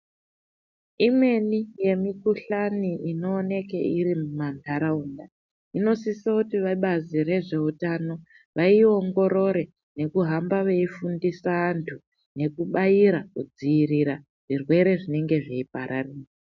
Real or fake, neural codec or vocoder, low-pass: real; none; 7.2 kHz